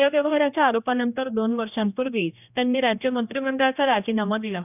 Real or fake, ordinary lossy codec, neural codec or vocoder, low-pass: fake; none; codec, 16 kHz, 1 kbps, X-Codec, HuBERT features, trained on general audio; 3.6 kHz